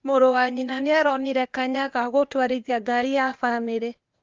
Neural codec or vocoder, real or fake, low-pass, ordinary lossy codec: codec, 16 kHz, 0.8 kbps, ZipCodec; fake; 7.2 kHz; Opus, 24 kbps